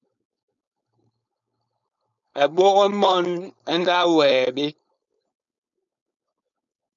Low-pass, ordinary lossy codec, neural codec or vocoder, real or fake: 7.2 kHz; MP3, 96 kbps; codec, 16 kHz, 4.8 kbps, FACodec; fake